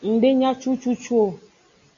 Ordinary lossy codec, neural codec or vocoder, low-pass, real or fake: AAC, 48 kbps; none; 7.2 kHz; real